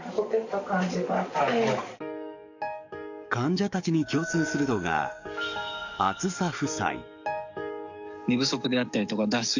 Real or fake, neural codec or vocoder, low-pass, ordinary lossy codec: fake; codec, 44.1 kHz, 7.8 kbps, DAC; 7.2 kHz; none